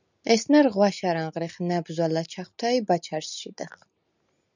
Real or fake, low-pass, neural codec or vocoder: real; 7.2 kHz; none